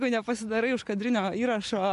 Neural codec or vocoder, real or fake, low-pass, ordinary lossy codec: none; real; 10.8 kHz; AAC, 96 kbps